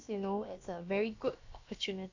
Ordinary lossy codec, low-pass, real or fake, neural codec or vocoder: AAC, 48 kbps; 7.2 kHz; fake; codec, 16 kHz, about 1 kbps, DyCAST, with the encoder's durations